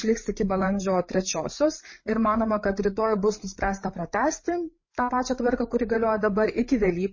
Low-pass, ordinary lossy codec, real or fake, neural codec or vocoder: 7.2 kHz; MP3, 32 kbps; fake; codec, 16 kHz, 8 kbps, FreqCodec, larger model